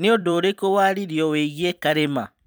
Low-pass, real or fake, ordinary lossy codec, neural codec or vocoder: none; fake; none; vocoder, 44.1 kHz, 128 mel bands every 256 samples, BigVGAN v2